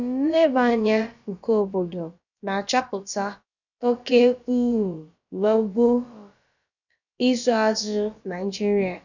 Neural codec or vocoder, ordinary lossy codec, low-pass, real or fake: codec, 16 kHz, about 1 kbps, DyCAST, with the encoder's durations; none; 7.2 kHz; fake